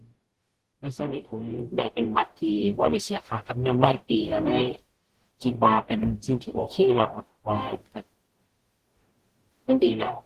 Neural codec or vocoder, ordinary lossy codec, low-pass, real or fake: codec, 44.1 kHz, 0.9 kbps, DAC; Opus, 16 kbps; 14.4 kHz; fake